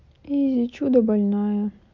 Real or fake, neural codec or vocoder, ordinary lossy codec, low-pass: real; none; MP3, 64 kbps; 7.2 kHz